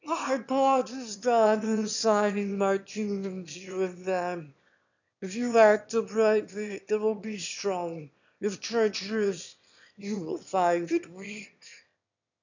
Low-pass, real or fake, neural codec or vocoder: 7.2 kHz; fake; autoencoder, 22.05 kHz, a latent of 192 numbers a frame, VITS, trained on one speaker